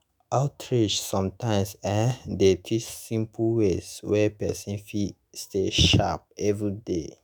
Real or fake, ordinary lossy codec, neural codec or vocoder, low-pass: fake; none; autoencoder, 48 kHz, 128 numbers a frame, DAC-VAE, trained on Japanese speech; none